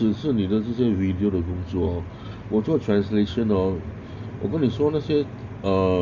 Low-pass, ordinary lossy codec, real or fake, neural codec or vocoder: 7.2 kHz; none; real; none